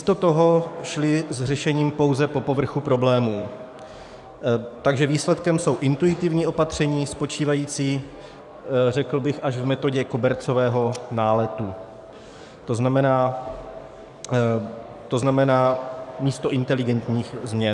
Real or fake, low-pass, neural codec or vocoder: fake; 10.8 kHz; codec, 44.1 kHz, 7.8 kbps, DAC